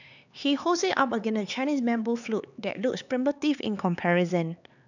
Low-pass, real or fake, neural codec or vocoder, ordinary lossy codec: 7.2 kHz; fake; codec, 16 kHz, 4 kbps, X-Codec, HuBERT features, trained on LibriSpeech; none